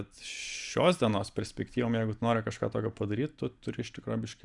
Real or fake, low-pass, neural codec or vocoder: fake; 10.8 kHz; vocoder, 44.1 kHz, 128 mel bands every 512 samples, BigVGAN v2